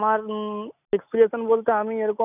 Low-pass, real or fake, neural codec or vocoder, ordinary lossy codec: 3.6 kHz; real; none; none